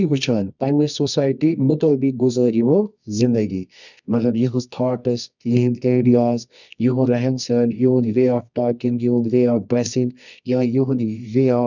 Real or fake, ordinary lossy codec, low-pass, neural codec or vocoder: fake; none; 7.2 kHz; codec, 24 kHz, 0.9 kbps, WavTokenizer, medium music audio release